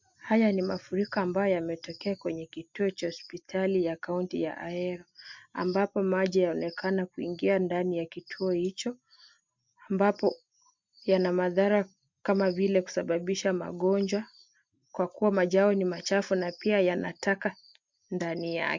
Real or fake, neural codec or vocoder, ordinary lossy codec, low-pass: real; none; MP3, 64 kbps; 7.2 kHz